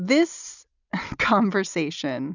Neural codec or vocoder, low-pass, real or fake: none; 7.2 kHz; real